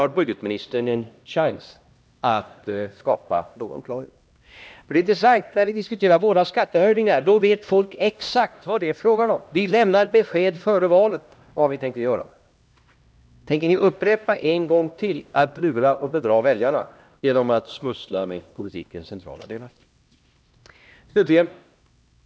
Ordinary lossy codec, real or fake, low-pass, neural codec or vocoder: none; fake; none; codec, 16 kHz, 1 kbps, X-Codec, HuBERT features, trained on LibriSpeech